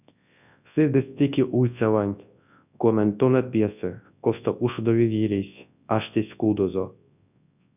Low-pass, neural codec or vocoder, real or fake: 3.6 kHz; codec, 24 kHz, 0.9 kbps, WavTokenizer, large speech release; fake